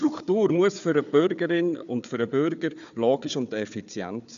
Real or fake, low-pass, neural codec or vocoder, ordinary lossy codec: fake; 7.2 kHz; codec, 16 kHz, 4 kbps, FunCodec, trained on Chinese and English, 50 frames a second; none